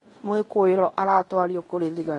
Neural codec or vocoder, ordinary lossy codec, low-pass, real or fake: codec, 16 kHz in and 24 kHz out, 0.9 kbps, LongCat-Audio-Codec, fine tuned four codebook decoder; AAC, 32 kbps; 10.8 kHz; fake